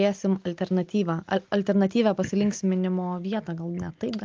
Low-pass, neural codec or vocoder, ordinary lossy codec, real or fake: 7.2 kHz; none; Opus, 24 kbps; real